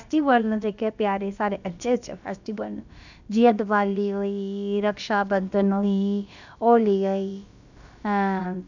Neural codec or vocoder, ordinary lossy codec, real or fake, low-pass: codec, 16 kHz, about 1 kbps, DyCAST, with the encoder's durations; none; fake; 7.2 kHz